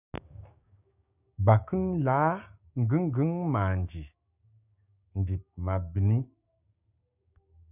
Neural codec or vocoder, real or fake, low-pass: codec, 16 kHz, 6 kbps, DAC; fake; 3.6 kHz